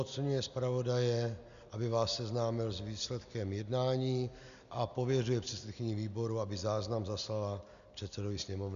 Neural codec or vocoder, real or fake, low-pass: none; real; 7.2 kHz